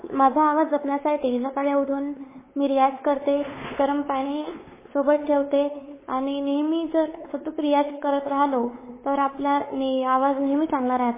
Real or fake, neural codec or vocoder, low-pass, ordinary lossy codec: fake; codec, 16 kHz, 4 kbps, X-Codec, WavLM features, trained on Multilingual LibriSpeech; 3.6 kHz; MP3, 16 kbps